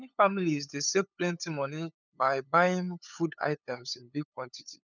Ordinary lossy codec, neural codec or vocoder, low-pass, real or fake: none; codec, 16 kHz, 8 kbps, FunCodec, trained on LibriTTS, 25 frames a second; 7.2 kHz; fake